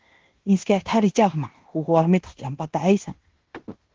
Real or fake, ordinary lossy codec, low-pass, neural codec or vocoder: fake; Opus, 16 kbps; 7.2 kHz; codec, 16 kHz in and 24 kHz out, 0.9 kbps, LongCat-Audio-Codec, fine tuned four codebook decoder